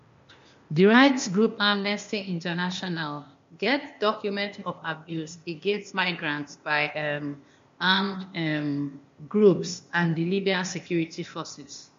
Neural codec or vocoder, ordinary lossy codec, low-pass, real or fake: codec, 16 kHz, 0.8 kbps, ZipCodec; MP3, 48 kbps; 7.2 kHz; fake